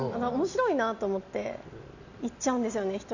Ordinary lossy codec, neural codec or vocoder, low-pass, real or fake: none; none; 7.2 kHz; real